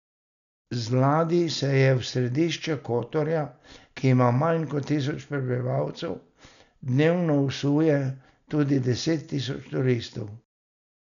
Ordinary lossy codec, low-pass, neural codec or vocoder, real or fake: none; 7.2 kHz; none; real